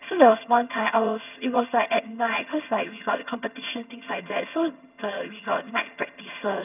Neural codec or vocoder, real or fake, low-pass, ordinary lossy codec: vocoder, 22.05 kHz, 80 mel bands, HiFi-GAN; fake; 3.6 kHz; none